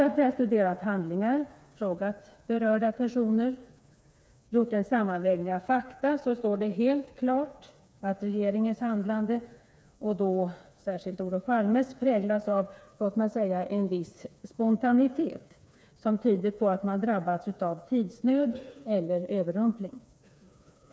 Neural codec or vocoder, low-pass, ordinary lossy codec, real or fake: codec, 16 kHz, 4 kbps, FreqCodec, smaller model; none; none; fake